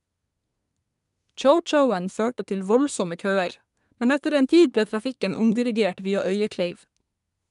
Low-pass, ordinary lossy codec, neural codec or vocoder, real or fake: 10.8 kHz; none; codec, 24 kHz, 1 kbps, SNAC; fake